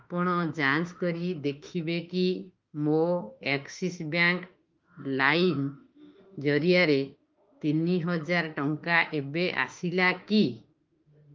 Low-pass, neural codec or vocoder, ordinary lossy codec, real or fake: 7.2 kHz; autoencoder, 48 kHz, 32 numbers a frame, DAC-VAE, trained on Japanese speech; Opus, 32 kbps; fake